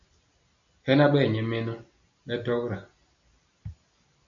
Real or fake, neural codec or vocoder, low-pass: real; none; 7.2 kHz